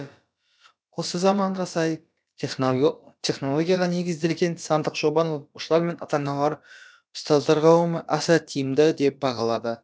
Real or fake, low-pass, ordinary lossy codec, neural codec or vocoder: fake; none; none; codec, 16 kHz, about 1 kbps, DyCAST, with the encoder's durations